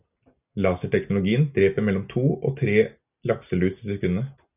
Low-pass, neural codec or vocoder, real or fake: 3.6 kHz; none; real